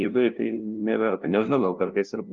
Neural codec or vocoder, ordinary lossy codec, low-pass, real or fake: codec, 16 kHz, 0.5 kbps, FunCodec, trained on LibriTTS, 25 frames a second; Opus, 24 kbps; 7.2 kHz; fake